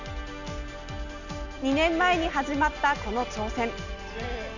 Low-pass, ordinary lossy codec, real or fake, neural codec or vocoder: 7.2 kHz; none; real; none